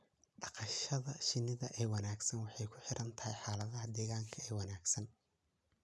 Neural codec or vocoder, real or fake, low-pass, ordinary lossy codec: none; real; none; none